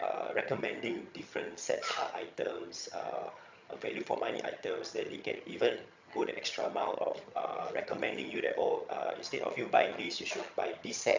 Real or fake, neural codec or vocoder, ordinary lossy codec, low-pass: fake; vocoder, 22.05 kHz, 80 mel bands, HiFi-GAN; MP3, 64 kbps; 7.2 kHz